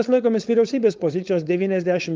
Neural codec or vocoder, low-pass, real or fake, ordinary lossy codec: codec, 16 kHz, 4.8 kbps, FACodec; 7.2 kHz; fake; Opus, 24 kbps